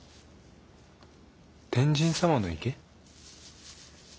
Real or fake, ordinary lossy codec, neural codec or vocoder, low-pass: real; none; none; none